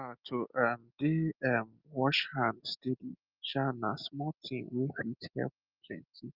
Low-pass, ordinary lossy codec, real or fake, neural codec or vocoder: 5.4 kHz; Opus, 24 kbps; real; none